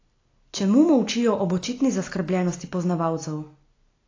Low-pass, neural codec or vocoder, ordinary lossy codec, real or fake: 7.2 kHz; none; AAC, 32 kbps; real